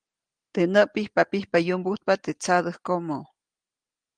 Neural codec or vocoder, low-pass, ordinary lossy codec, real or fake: none; 9.9 kHz; Opus, 24 kbps; real